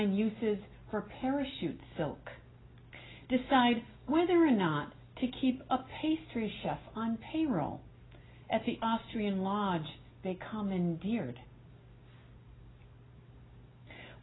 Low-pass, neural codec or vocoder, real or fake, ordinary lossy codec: 7.2 kHz; none; real; AAC, 16 kbps